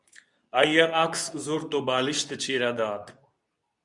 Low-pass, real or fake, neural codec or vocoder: 10.8 kHz; fake; codec, 24 kHz, 0.9 kbps, WavTokenizer, medium speech release version 2